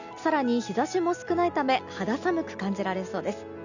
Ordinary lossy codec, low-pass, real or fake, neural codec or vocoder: none; 7.2 kHz; real; none